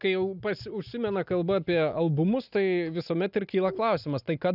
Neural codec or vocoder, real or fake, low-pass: none; real; 5.4 kHz